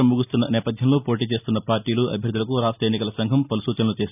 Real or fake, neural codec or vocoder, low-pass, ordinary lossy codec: real; none; 3.6 kHz; none